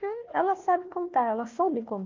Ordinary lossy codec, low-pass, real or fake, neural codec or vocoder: Opus, 32 kbps; 7.2 kHz; fake; codec, 16 kHz, 1 kbps, FunCodec, trained on Chinese and English, 50 frames a second